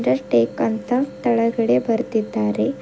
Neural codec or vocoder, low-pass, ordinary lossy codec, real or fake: none; none; none; real